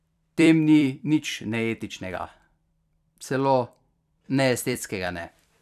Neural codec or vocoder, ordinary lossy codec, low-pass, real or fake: vocoder, 44.1 kHz, 128 mel bands every 256 samples, BigVGAN v2; none; 14.4 kHz; fake